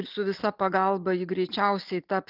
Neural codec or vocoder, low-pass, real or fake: none; 5.4 kHz; real